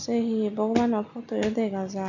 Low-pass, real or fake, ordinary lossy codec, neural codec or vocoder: 7.2 kHz; real; none; none